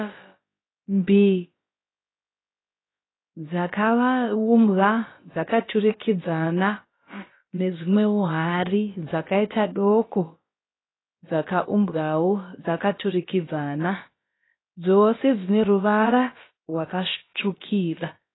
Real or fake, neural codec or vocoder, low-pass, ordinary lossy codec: fake; codec, 16 kHz, about 1 kbps, DyCAST, with the encoder's durations; 7.2 kHz; AAC, 16 kbps